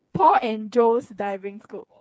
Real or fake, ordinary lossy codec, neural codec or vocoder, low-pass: fake; none; codec, 16 kHz, 4 kbps, FreqCodec, smaller model; none